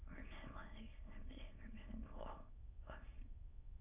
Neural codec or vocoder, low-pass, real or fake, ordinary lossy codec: autoencoder, 22.05 kHz, a latent of 192 numbers a frame, VITS, trained on many speakers; 3.6 kHz; fake; AAC, 32 kbps